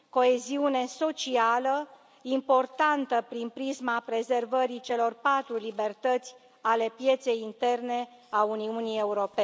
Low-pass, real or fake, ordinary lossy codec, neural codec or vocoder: none; real; none; none